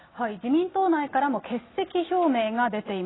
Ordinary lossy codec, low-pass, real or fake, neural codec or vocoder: AAC, 16 kbps; 7.2 kHz; real; none